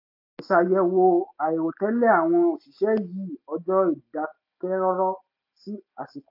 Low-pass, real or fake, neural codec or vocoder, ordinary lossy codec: 5.4 kHz; real; none; AAC, 48 kbps